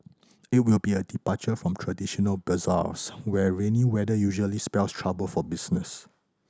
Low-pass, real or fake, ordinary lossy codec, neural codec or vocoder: none; real; none; none